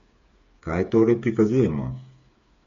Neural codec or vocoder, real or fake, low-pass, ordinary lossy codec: codec, 16 kHz, 8 kbps, FreqCodec, smaller model; fake; 7.2 kHz; MP3, 48 kbps